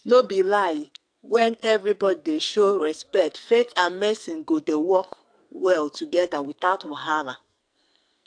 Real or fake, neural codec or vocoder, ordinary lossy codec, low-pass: fake; codec, 44.1 kHz, 2.6 kbps, SNAC; AAC, 64 kbps; 9.9 kHz